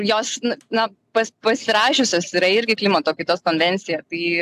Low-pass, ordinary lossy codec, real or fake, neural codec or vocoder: 14.4 kHz; AAC, 96 kbps; real; none